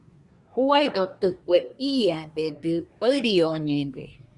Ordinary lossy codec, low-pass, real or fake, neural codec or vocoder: Opus, 64 kbps; 10.8 kHz; fake; codec, 24 kHz, 1 kbps, SNAC